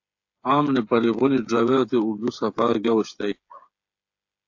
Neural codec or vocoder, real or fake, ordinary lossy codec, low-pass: codec, 16 kHz, 8 kbps, FreqCodec, smaller model; fake; AAC, 48 kbps; 7.2 kHz